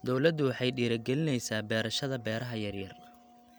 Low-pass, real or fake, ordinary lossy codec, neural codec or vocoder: none; real; none; none